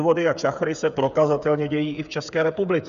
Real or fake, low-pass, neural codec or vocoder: fake; 7.2 kHz; codec, 16 kHz, 8 kbps, FreqCodec, smaller model